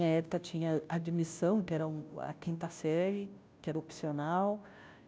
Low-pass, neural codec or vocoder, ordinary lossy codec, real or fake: none; codec, 16 kHz, 0.5 kbps, FunCodec, trained on Chinese and English, 25 frames a second; none; fake